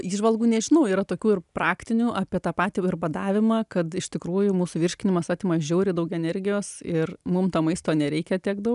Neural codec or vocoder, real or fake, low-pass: none; real; 10.8 kHz